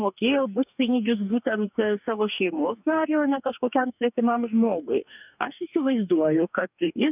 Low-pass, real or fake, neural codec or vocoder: 3.6 kHz; fake; codec, 44.1 kHz, 2.6 kbps, DAC